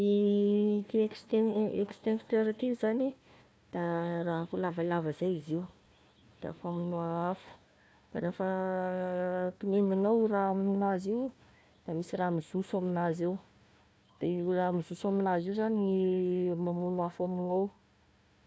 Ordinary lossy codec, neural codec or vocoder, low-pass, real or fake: none; codec, 16 kHz, 1 kbps, FunCodec, trained on Chinese and English, 50 frames a second; none; fake